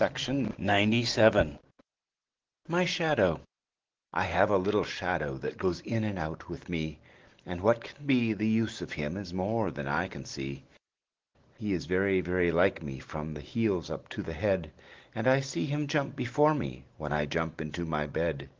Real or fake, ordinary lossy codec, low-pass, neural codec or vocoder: real; Opus, 16 kbps; 7.2 kHz; none